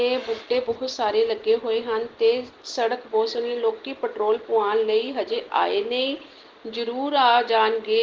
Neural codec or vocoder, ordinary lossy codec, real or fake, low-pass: none; Opus, 16 kbps; real; 7.2 kHz